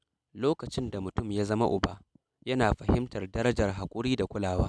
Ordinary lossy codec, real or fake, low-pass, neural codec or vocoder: none; real; none; none